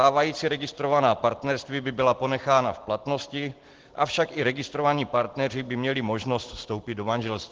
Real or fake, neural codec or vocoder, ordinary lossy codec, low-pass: real; none; Opus, 16 kbps; 7.2 kHz